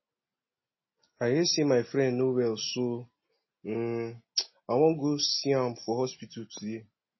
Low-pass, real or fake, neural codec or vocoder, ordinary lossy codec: 7.2 kHz; real; none; MP3, 24 kbps